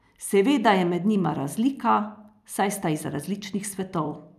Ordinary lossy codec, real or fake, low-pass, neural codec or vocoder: none; real; 14.4 kHz; none